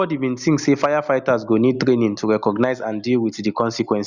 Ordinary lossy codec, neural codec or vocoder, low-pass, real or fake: Opus, 64 kbps; none; 7.2 kHz; real